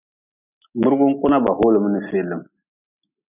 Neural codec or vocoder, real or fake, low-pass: none; real; 3.6 kHz